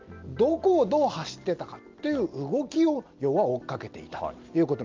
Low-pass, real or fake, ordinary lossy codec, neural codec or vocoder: 7.2 kHz; real; Opus, 24 kbps; none